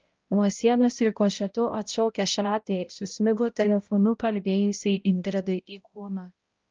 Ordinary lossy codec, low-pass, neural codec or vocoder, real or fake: Opus, 24 kbps; 7.2 kHz; codec, 16 kHz, 0.5 kbps, X-Codec, HuBERT features, trained on balanced general audio; fake